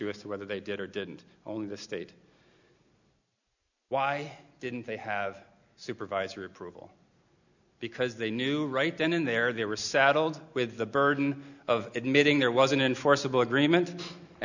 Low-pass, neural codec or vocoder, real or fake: 7.2 kHz; none; real